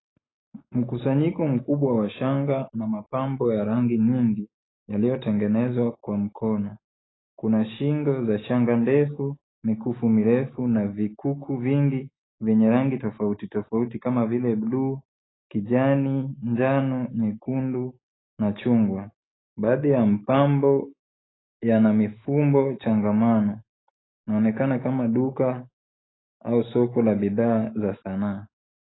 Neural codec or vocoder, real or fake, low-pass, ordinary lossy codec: none; real; 7.2 kHz; AAC, 16 kbps